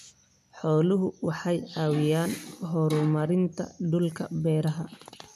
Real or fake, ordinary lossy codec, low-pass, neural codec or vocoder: real; none; 14.4 kHz; none